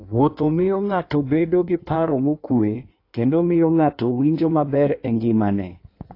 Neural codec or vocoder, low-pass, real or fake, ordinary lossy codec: codec, 16 kHz in and 24 kHz out, 1.1 kbps, FireRedTTS-2 codec; 5.4 kHz; fake; AAC, 32 kbps